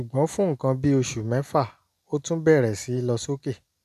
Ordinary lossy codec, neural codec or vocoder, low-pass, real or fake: none; none; 14.4 kHz; real